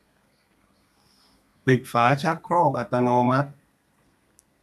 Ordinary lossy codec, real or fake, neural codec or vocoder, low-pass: none; fake; codec, 32 kHz, 1.9 kbps, SNAC; 14.4 kHz